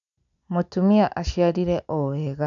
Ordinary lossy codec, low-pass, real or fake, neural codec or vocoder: none; 7.2 kHz; real; none